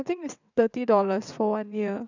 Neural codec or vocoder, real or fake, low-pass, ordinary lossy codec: vocoder, 22.05 kHz, 80 mel bands, WaveNeXt; fake; 7.2 kHz; none